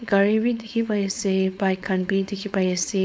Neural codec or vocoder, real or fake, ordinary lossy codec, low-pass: codec, 16 kHz, 4.8 kbps, FACodec; fake; none; none